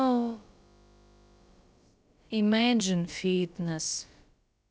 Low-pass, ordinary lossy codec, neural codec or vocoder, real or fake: none; none; codec, 16 kHz, about 1 kbps, DyCAST, with the encoder's durations; fake